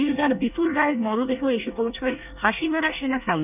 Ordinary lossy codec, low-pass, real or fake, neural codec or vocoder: none; 3.6 kHz; fake; codec, 24 kHz, 1 kbps, SNAC